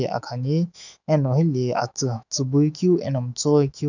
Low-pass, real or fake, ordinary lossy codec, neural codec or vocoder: 7.2 kHz; fake; none; autoencoder, 48 kHz, 128 numbers a frame, DAC-VAE, trained on Japanese speech